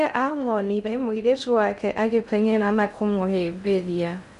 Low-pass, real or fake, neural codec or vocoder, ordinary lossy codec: 10.8 kHz; fake; codec, 16 kHz in and 24 kHz out, 0.6 kbps, FocalCodec, streaming, 2048 codes; none